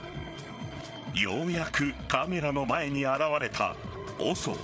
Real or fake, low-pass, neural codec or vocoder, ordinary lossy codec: fake; none; codec, 16 kHz, 8 kbps, FreqCodec, larger model; none